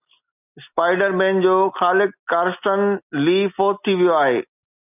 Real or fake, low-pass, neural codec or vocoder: real; 3.6 kHz; none